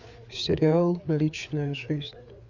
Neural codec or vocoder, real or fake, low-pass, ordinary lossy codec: codec, 16 kHz, 4 kbps, FreqCodec, larger model; fake; 7.2 kHz; none